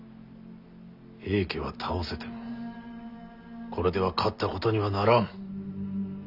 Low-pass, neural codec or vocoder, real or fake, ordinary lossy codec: 5.4 kHz; none; real; none